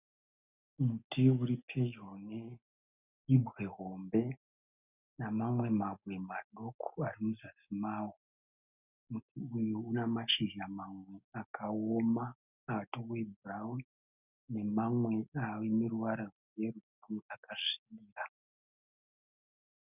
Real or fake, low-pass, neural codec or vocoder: real; 3.6 kHz; none